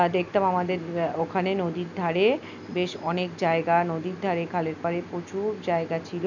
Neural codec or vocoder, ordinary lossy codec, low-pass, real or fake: none; none; 7.2 kHz; real